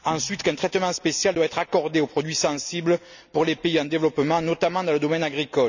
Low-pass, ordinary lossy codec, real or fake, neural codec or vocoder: 7.2 kHz; none; real; none